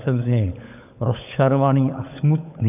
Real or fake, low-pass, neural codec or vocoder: fake; 3.6 kHz; codec, 16 kHz, 16 kbps, FunCodec, trained on LibriTTS, 50 frames a second